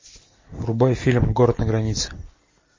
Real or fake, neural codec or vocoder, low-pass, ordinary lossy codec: real; none; 7.2 kHz; MP3, 32 kbps